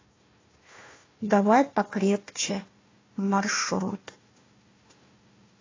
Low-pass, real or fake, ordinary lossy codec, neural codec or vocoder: 7.2 kHz; fake; AAC, 32 kbps; codec, 16 kHz, 1 kbps, FunCodec, trained on Chinese and English, 50 frames a second